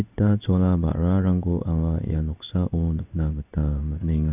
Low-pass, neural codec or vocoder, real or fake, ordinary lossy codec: 3.6 kHz; codec, 16 kHz in and 24 kHz out, 1 kbps, XY-Tokenizer; fake; none